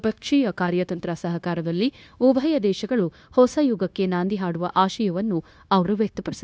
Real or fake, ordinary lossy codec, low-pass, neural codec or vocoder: fake; none; none; codec, 16 kHz, 0.9 kbps, LongCat-Audio-Codec